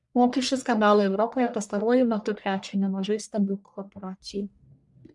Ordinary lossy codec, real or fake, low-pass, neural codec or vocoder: MP3, 96 kbps; fake; 10.8 kHz; codec, 44.1 kHz, 1.7 kbps, Pupu-Codec